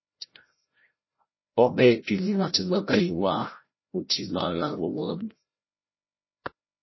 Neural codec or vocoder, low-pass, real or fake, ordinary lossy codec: codec, 16 kHz, 0.5 kbps, FreqCodec, larger model; 7.2 kHz; fake; MP3, 24 kbps